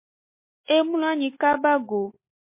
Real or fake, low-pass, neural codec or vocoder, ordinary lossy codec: real; 3.6 kHz; none; MP3, 24 kbps